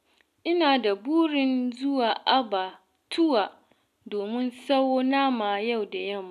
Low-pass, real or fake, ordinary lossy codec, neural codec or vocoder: 14.4 kHz; real; none; none